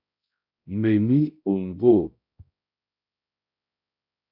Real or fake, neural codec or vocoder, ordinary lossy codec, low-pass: fake; codec, 16 kHz, 0.5 kbps, X-Codec, HuBERT features, trained on balanced general audio; AAC, 48 kbps; 5.4 kHz